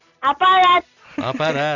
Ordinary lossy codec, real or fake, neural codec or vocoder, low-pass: none; real; none; 7.2 kHz